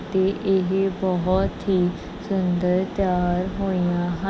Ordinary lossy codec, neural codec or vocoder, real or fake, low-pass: none; none; real; none